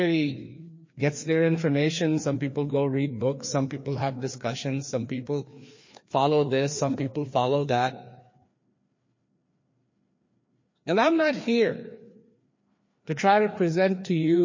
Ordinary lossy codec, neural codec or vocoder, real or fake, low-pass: MP3, 32 kbps; codec, 16 kHz, 2 kbps, FreqCodec, larger model; fake; 7.2 kHz